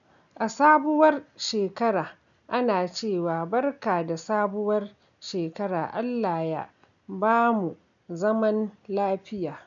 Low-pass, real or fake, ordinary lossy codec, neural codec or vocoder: 7.2 kHz; real; none; none